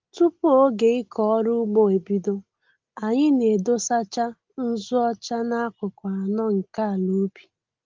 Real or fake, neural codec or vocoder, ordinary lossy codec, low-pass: real; none; Opus, 32 kbps; 7.2 kHz